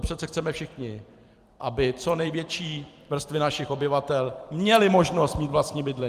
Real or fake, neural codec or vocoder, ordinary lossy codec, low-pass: fake; vocoder, 44.1 kHz, 128 mel bands every 256 samples, BigVGAN v2; Opus, 32 kbps; 14.4 kHz